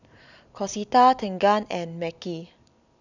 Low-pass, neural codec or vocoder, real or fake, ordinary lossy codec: 7.2 kHz; none; real; none